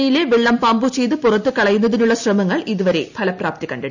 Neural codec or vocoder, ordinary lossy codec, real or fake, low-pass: none; none; real; 7.2 kHz